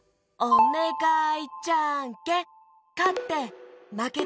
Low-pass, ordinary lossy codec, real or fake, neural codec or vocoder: none; none; real; none